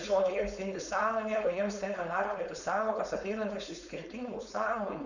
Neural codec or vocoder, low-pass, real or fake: codec, 16 kHz, 4.8 kbps, FACodec; 7.2 kHz; fake